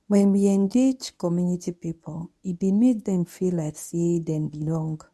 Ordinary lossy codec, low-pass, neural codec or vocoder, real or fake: none; none; codec, 24 kHz, 0.9 kbps, WavTokenizer, medium speech release version 1; fake